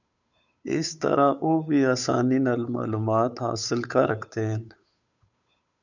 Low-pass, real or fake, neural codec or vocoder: 7.2 kHz; fake; codec, 16 kHz, 8 kbps, FunCodec, trained on Chinese and English, 25 frames a second